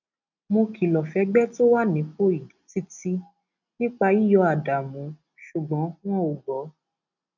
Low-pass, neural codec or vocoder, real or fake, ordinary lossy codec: 7.2 kHz; none; real; none